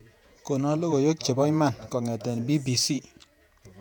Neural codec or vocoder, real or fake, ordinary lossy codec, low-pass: vocoder, 48 kHz, 128 mel bands, Vocos; fake; none; 19.8 kHz